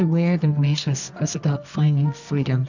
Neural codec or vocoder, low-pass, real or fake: codec, 24 kHz, 0.9 kbps, WavTokenizer, medium music audio release; 7.2 kHz; fake